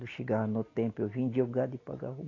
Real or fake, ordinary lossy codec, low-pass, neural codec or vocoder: real; AAC, 32 kbps; 7.2 kHz; none